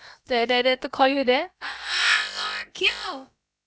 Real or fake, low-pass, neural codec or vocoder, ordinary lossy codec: fake; none; codec, 16 kHz, about 1 kbps, DyCAST, with the encoder's durations; none